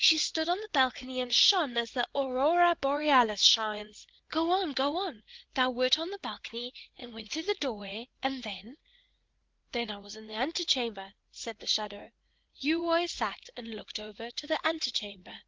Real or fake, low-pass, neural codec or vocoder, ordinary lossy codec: fake; 7.2 kHz; vocoder, 44.1 kHz, 80 mel bands, Vocos; Opus, 16 kbps